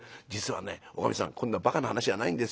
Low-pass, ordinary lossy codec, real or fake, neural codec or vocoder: none; none; real; none